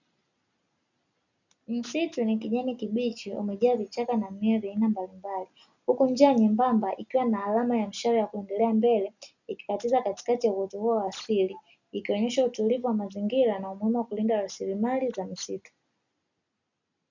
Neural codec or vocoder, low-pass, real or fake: none; 7.2 kHz; real